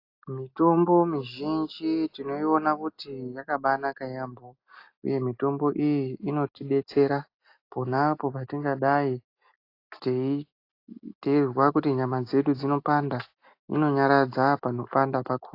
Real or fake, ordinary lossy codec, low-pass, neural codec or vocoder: real; AAC, 32 kbps; 5.4 kHz; none